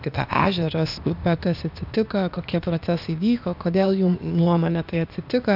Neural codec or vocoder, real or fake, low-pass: codec, 16 kHz, 0.8 kbps, ZipCodec; fake; 5.4 kHz